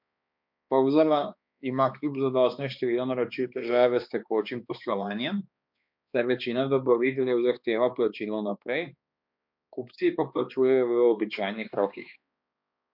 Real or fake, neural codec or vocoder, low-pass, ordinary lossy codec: fake; codec, 16 kHz, 2 kbps, X-Codec, HuBERT features, trained on balanced general audio; 5.4 kHz; MP3, 48 kbps